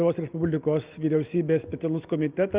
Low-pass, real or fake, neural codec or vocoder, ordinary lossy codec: 3.6 kHz; real; none; Opus, 32 kbps